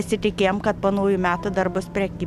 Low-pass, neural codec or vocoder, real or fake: 14.4 kHz; none; real